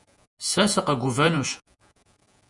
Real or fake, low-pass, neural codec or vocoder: fake; 10.8 kHz; vocoder, 48 kHz, 128 mel bands, Vocos